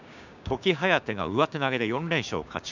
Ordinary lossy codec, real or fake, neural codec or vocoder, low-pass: none; fake; codec, 16 kHz, 6 kbps, DAC; 7.2 kHz